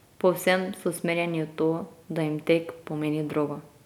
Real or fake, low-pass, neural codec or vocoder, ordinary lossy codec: real; 19.8 kHz; none; none